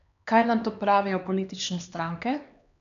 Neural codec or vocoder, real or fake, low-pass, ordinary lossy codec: codec, 16 kHz, 2 kbps, X-Codec, HuBERT features, trained on LibriSpeech; fake; 7.2 kHz; none